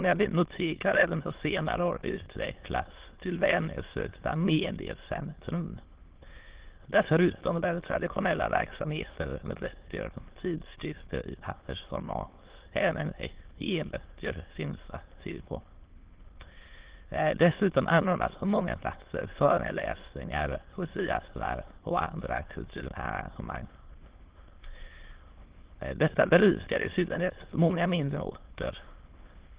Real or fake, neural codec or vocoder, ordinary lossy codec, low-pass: fake; autoencoder, 22.05 kHz, a latent of 192 numbers a frame, VITS, trained on many speakers; Opus, 16 kbps; 3.6 kHz